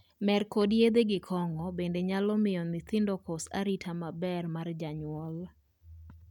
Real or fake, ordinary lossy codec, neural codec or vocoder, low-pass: real; none; none; 19.8 kHz